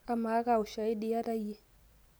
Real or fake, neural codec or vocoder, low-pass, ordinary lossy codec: real; none; none; none